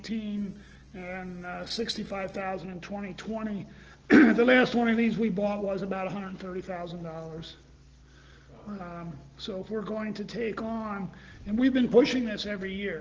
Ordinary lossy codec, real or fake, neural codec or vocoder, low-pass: Opus, 16 kbps; real; none; 7.2 kHz